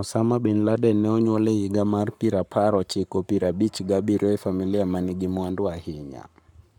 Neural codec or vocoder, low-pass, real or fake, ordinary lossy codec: codec, 44.1 kHz, 7.8 kbps, Pupu-Codec; 19.8 kHz; fake; none